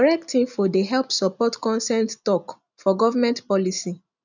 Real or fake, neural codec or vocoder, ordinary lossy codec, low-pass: real; none; none; 7.2 kHz